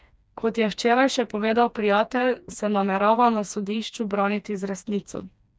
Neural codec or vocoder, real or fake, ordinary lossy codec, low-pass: codec, 16 kHz, 2 kbps, FreqCodec, smaller model; fake; none; none